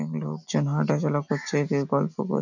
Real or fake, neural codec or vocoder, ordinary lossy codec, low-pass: real; none; none; 7.2 kHz